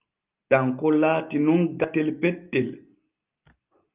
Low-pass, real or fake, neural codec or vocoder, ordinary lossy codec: 3.6 kHz; real; none; Opus, 24 kbps